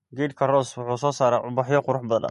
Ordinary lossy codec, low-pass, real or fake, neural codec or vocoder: MP3, 48 kbps; 14.4 kHz; real; none